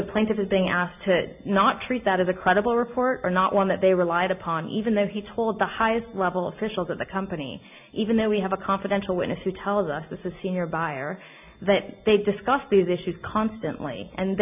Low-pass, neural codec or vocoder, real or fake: 3.6 kHz; none; real